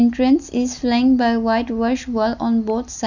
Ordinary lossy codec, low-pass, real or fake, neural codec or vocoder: none; 7.2 kHz; real; none